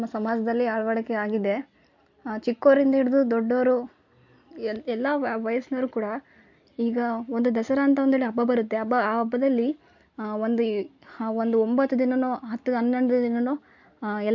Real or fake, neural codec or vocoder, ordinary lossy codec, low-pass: real; none; MP3, 48 kbps; 7.2 kHz